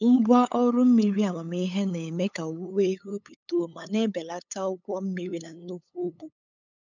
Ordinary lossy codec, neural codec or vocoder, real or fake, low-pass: none; codec, 16 kHz, 8 kbps, FunCodec, trained on LibriTTS, 25 frames a second; fake; 7.2 kHz